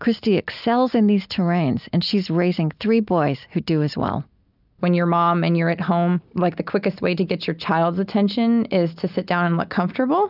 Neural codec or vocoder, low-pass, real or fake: none; 5.4 kHz; real